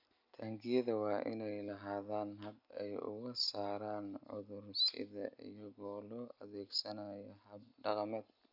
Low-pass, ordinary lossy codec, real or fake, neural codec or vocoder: 5.4 kHz; none; real; none